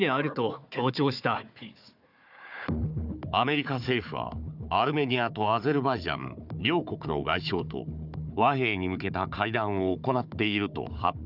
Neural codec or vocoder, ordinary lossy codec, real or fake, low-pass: codec, 16 kHz, 4 kbps, FunCodec, trained on Chinese and English, 50 frames a second; none; fake; 5.4 kHz